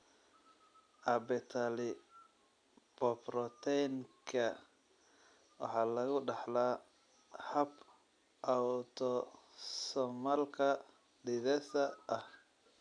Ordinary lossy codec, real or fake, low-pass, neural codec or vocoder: none; real; 9.9 kHz; none